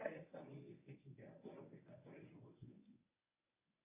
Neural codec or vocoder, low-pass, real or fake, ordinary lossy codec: codec, 24 kHz, 0.9 kbps, WavTokenizer, medium speech release version 1; 3.6 kHz; fake; AAC, 24 kbps